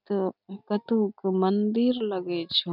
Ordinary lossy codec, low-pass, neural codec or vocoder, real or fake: none; 5.4 kHz; none; real